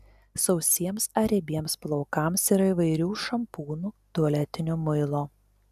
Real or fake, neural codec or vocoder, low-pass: real; none; 14.4 kHz